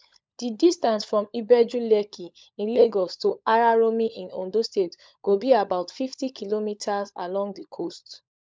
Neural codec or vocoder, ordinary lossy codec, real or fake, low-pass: codec, 16 kHz, 16 kbps, FunCodec, trained on LibriTTS, 50 frames a second; none; fake; none